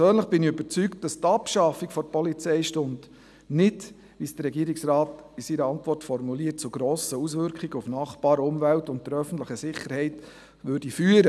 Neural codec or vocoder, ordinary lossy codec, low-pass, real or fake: none; none; none; real